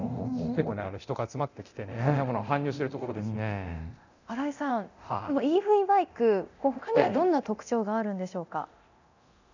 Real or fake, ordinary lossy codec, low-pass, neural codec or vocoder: fake; none; 7.2 kHz; codec, 24 kHz, 0.9 kbps, DualCodec